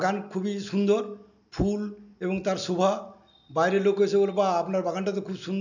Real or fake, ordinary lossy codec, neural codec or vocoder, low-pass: real; none; none; 7.2 kHz